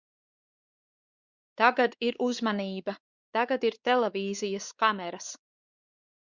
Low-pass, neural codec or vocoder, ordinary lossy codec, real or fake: 7.2 kHz; codec, 16 kHz, 4 kbps, X-Codec, WavLM features, trained on Multilingual LibriSpeech; Opus, 64 kbps; fake